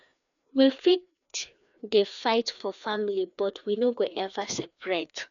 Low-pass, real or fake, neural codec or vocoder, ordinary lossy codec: 7.2 kHz; fake; codec, 16 kHz, 2 kbps, FreqCodec, larger model; none